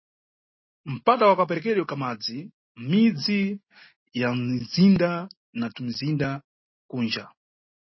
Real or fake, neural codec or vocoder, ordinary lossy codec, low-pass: real; none; MP3, 24 kbps; 7.2 kHz